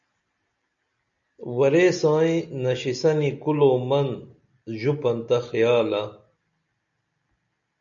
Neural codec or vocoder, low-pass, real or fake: none; 7.2 kHz; real